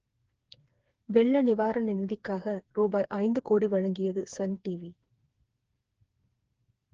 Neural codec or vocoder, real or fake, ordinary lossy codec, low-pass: codec, 16 kHz, 4 kbps, FreqCodec, smaller model; fake; Opus, 16 kbps; 7.2 kHz